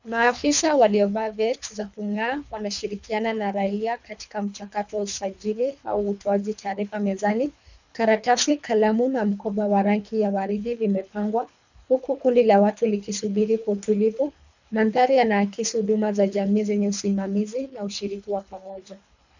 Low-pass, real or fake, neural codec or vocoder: 7.2 kHz; fake; codec, 24 kHz, 3 kbps, HILCodec